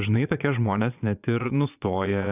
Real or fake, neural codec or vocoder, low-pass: fake; vocoder, 22.05 kHz, 80 mel bands, Vocos; 3.6 kHz